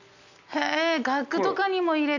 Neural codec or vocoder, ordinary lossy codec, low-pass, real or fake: none; none; 7.2 kHz; real